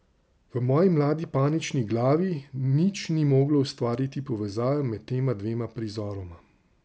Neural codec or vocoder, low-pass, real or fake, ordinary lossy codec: none; none; real; none